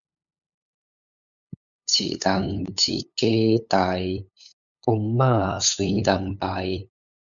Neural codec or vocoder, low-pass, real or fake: codec, 16 kHz, 8 kbps, FunCodec, trained on LibriTTS, 25 frames a second; 7.2 kHz; fake